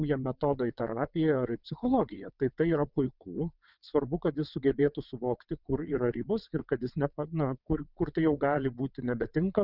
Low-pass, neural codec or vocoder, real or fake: 5.4 kHz; vocoder, 22.05 kHz, 80 mel bands, WaveNeXt; fake